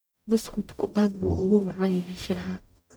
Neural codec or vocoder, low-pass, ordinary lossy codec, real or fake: codec, 44.1 kHz, 0.9 kbps, DAC; none; none; fake